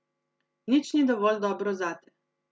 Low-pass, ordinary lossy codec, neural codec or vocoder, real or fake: none; none; none; real